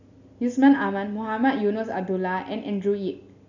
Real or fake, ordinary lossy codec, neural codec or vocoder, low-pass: real; none; none; 7.2 kHz